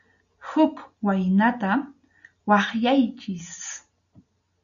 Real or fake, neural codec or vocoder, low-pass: real; none; 7.2 kHz